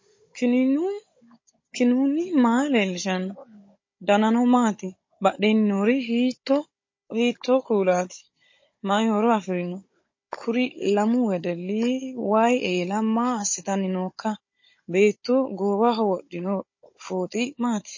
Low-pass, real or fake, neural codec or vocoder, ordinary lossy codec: 7.2 kHz; fake; codec, 16 kHz, 16 kbps, FunCodec, trained on Chinese and English, 50 frames a second; MP3, 32 kbps